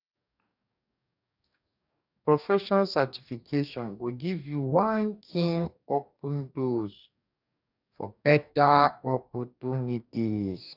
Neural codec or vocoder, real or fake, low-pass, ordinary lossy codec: codec, 44.1 kHz, 2.6 kbps, DAC; fake; 5.4 kHz; none